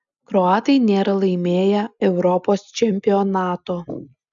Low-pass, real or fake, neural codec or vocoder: 7.2 kHz; real; none